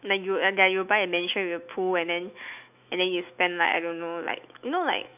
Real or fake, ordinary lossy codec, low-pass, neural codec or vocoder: fake; none; 3.6 kHz; autoencoder, 48 kHz, 128 numbers a frame, DAC-VAE, trained on Japanese speech